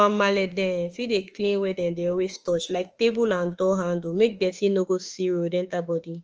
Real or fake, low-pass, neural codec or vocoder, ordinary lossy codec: fake; 7.2 kHz; autoencoder, 48 kHz, 32 numbers a frame, DAC-VAE, trained on Japanese speech; Opus, 24 kbps